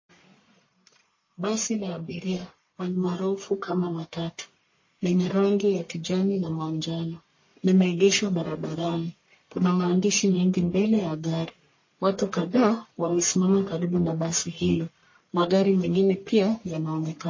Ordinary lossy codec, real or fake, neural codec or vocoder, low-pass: MP3, 32 kbps; fake; codec, 44.1 kHz, 1.7 kbps, Pupu-Codec; 7.2 kHz